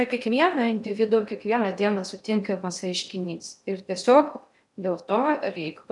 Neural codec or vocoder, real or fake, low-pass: codec, 16 kHz in and 24 kHz out, 0.6 kbps, FocalCodec, streaming, 2048 codes; fake; 10.8 kHz